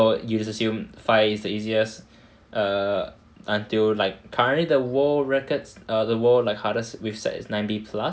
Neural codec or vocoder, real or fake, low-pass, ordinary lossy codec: none; real; none; none